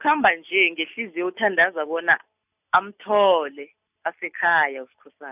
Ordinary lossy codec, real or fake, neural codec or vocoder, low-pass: none; real; none; 3.6 kHz